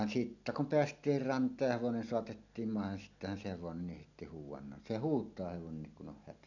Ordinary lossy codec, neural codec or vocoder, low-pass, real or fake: none; none; 7.2 kHz; real